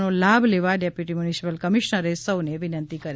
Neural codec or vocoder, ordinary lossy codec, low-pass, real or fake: none; none; none; real